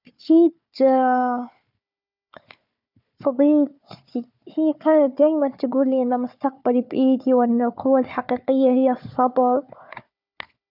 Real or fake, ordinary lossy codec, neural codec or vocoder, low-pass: fake; none; codec, 16 kHz, 4 kbps, FunCodec, trained on Chinese and English, 50 frames a second; 5.4 kHz